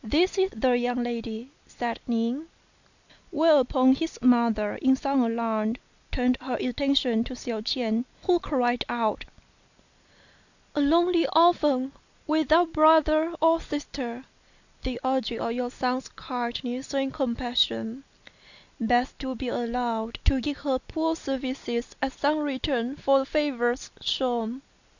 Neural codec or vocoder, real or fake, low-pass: none; real; 7.2 kHz